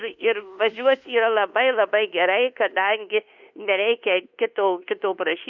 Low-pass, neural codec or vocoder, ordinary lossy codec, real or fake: 7.2 kHz; codec, 24 kHz, 1.2 kbps, DualCodec; Opus, 64 kbps; fake